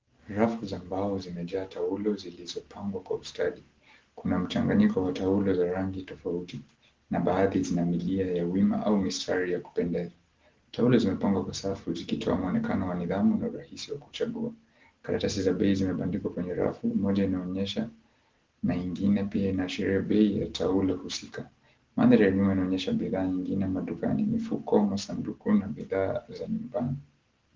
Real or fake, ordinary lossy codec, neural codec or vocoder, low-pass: real; Opus, 16 kbps; none; 7.2 kHz